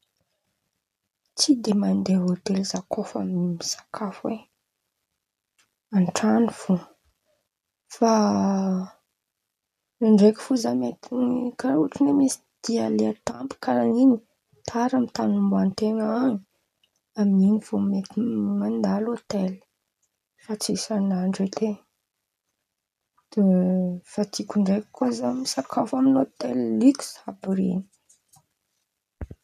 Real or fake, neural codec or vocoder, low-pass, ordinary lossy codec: real; none; 14.4 kHz; none